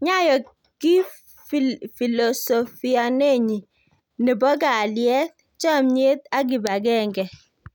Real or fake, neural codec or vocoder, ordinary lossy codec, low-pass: real; none; none; 19.8 kHz